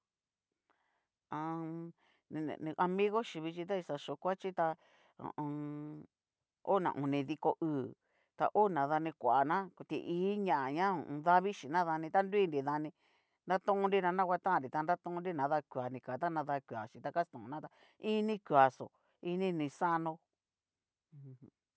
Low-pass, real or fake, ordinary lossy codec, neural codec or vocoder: none; real; none; none